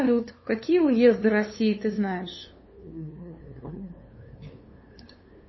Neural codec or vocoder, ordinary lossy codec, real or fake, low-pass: codec, 16 kHz, 2 kbps, FunCodec, trained on LibriTTS, 25 frames a second; MP3, 24 kbps; fake; 7.2 kHz